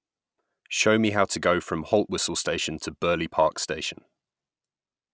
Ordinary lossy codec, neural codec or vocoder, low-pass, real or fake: none; none; none; real